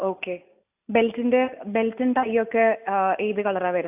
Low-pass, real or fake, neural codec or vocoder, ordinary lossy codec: 3.6 kHz; real; none; AAC, 32 kbps